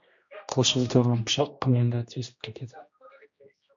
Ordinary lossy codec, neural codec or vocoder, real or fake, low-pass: MP3, 48 kbps; codec, 16 kHz, 1 kbps, X-Codec, HuBERT features, trained on general audio; fake; 7.2 kHz